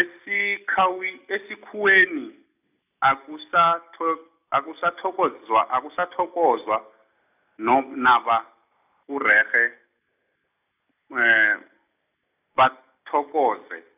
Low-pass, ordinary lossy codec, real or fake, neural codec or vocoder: 3.6 kHz; none; real; none